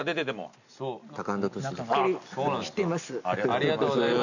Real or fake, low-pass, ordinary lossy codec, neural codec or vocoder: real; 7.2 kHz; none; none